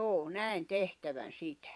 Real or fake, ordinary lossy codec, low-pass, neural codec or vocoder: fake; none; none; vocoder, 22.05 kHz, 80 mel bands, Vocos